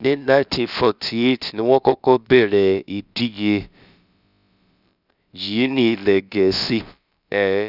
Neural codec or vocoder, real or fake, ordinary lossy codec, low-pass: codec, 16 kHz, about 1 kbps, DyCAST, with the encoder's durations; fake; none; 5.4 kHz